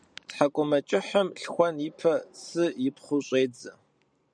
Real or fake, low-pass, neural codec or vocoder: fake; 9.9 kHz; vocoder, 44.1 kHz, 128 mel bands every 512 samples, BigVGAN v2